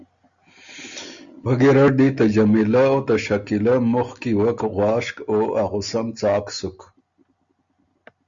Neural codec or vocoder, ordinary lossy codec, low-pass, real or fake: none; Opus, 64 kbps; 7.2 kHz; real